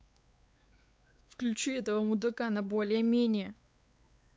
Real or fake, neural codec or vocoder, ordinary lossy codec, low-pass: fake; codec, 16 kHz, 4 kbps, X-Codec, WavLM features, trained on Multilingual LibriSpeech; none; none